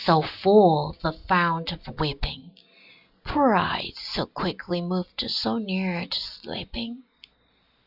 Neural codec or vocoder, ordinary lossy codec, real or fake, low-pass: none; Opus, 64 kbps; real; 5.4 kHz